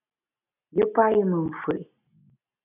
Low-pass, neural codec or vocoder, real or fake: 3.6 kHz; none; real